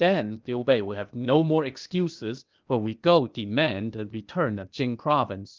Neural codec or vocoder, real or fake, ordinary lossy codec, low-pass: codec, 16 kHz, 0.8 kbps, ZipCodec; fake; Opus, 24 kbps; 7.2 kHz